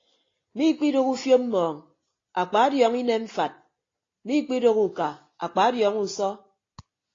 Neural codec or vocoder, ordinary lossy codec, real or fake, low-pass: none; AAC, 32 kbps; real; 7.2 kHz